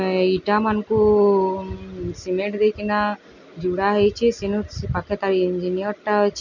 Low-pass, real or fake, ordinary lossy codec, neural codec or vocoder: 7.2 kHz; real; none; none